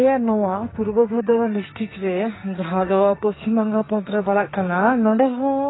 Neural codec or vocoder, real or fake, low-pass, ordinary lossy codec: codec, 44.1 kHz, 2.6 kbps, SNAC; fake; 7.2 kHz; AAC, 16 kbps